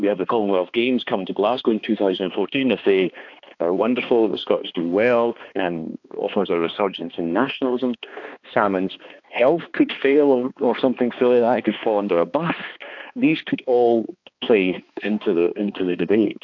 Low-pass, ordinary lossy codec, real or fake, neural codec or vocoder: 7.2 kHz; AAC, 48 kbps; fake; codec, 16 kHz, 2 kbps, X-Codec, HuBERT features, trained on balanced general audio